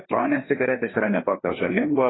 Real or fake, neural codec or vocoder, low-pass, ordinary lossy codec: fake; codec, 16 kHz, 2 kbps, FreqCodec, larger model; 7.2 kHz; AAC, 16 kbps